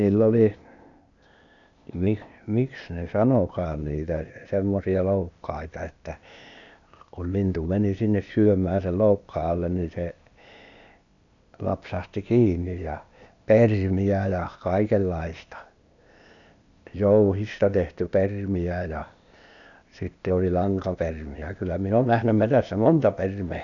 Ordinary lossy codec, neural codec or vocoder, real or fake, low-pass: none; codec, 16 kHz, 0.8 kbps, ZipCodec; fake; 7.2 kHz